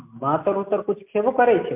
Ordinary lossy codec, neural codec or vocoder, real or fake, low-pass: MP3, 32 kbps; none; real; 3.6 kHz